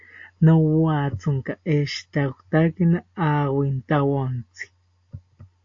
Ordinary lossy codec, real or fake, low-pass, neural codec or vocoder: AAC, 48 kbps; real; 7.2 kHz; none